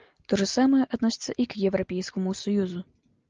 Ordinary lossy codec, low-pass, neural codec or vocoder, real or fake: Opus, 24 kbps; 7.2 kHz; none; real